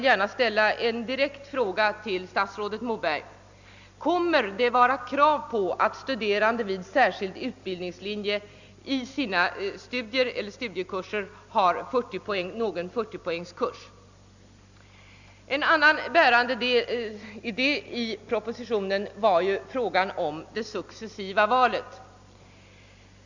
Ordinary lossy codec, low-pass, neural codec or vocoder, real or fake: Opus, 64 kbps; 7.2 kHz; none; real